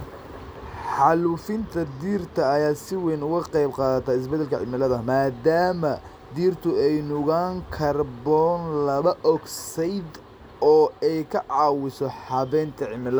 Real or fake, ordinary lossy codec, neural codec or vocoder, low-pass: real; none; none; none